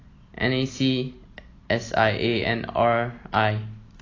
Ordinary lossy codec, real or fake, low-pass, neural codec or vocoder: AAC, 32 kbps; real; 7.2 kHz; none